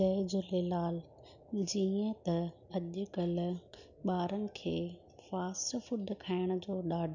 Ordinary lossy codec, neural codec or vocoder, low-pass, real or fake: none; none; 7.2 kHz; real